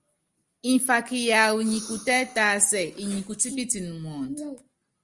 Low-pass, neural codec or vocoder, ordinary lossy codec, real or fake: 10.8 kHz; none; Opus, 32 kbps; real